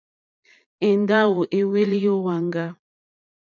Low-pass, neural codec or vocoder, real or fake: 7.2 kHz; vocoder, 22.05 kHz, 80 mel bands, Vocos; fake